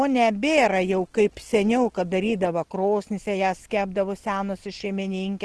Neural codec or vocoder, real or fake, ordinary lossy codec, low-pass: none; real; Opus, 16 kbps; 10.8 kHz